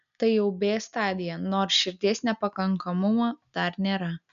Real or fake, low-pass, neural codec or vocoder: real; 7.2 kHz; none